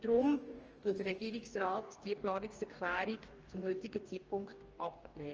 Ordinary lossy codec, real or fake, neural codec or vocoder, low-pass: Opus, 24 kbps; fake; codec, 44.1 kHz, 2.6 kbps, DAC; 7.2 kHz